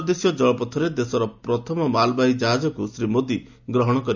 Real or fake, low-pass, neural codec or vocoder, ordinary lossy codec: real; 7.2 kHz; none; none